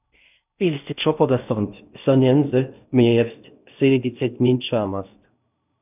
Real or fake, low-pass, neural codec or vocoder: fake; 3.6 kHz; codec, 16 kHz in and 24 kHz out, 0.6 kbps, FocalCodec, streaming, 4096 codes